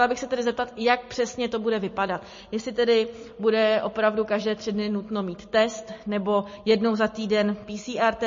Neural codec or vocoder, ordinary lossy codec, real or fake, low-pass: none; MP3, 32 kbps; real; 7.2 kHz